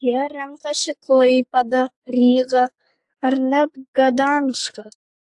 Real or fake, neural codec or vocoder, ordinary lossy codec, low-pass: fake; codec, 44.1 kHz, 2.6 kbps, SNAC; AAC, 64 kbps; 10.8 kHz